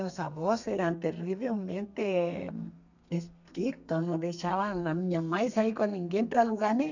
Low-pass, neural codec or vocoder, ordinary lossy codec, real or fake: 7.2 kHz; codec, 32 kHz, 1.9 kbps, SNAC; none; fake